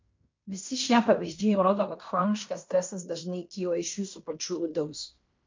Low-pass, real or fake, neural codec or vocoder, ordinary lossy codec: 7.2 kHz; fake; codec, 16 kHz in and 24 kHz out, 0.9 kbps, LongCat-Audio-Codec, fine tuned four codebook decoder; MP3, 48 kbps